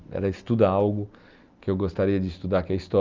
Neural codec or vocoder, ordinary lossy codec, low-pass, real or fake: none; Opus, 32 kbps; 7.2 kHz; real